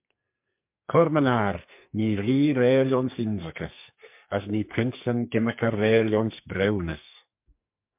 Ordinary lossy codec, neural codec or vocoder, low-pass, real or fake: MP3, 32 kbps; codec, 44.1 kHz, 2.6 kbps, SNAC; 3.6 kHz; fake